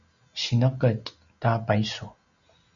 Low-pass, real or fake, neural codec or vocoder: 7.2 kHz; real; none